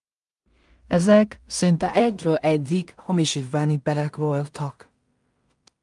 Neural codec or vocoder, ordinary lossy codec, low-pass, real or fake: codec, 16 kHz in and 24 kHz out, 0.4 kbps, LongCat-Audio-Codec, two codebook decoder; Opus, 32 kbps; 10.8 kHz; fake